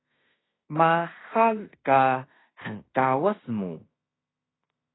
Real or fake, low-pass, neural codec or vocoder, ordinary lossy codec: fake; 7.2 kHz; codec, 16 kHz in and 24 kHz out, 0.9 kbps, LongCat-Audio-Codec, fine tuned four codebook decoder; AAC, 16 kbps